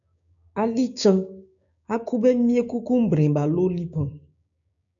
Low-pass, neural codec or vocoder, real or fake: 7.2 kHz; codec, 16 kHz, 6 kbps, DAC; fake